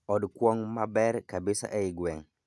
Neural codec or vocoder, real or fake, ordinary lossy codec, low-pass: none; real; none; none